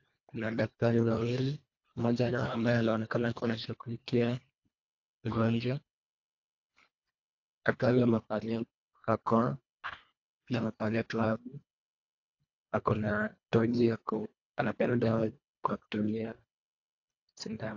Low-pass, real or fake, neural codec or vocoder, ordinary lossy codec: 7.2 kHz; fake; codec, 24 kHz, 1.5 kbps, HILCodec; AAC, 48 kbps